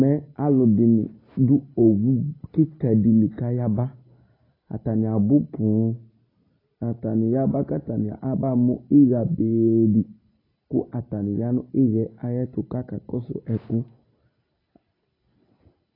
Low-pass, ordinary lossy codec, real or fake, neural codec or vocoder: 5.4 kHz; AAC, 24 kbps; real; none